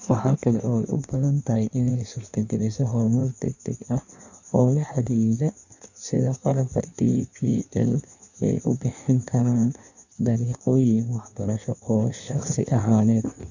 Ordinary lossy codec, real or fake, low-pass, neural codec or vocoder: none; fake; 7.2 kHz; codec, 16 kHz in and 24 kHz out, 1.1 kbps, FireRedTTS-2 codec